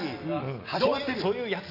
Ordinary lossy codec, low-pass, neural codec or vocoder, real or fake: none; 5.4 kHz; none; real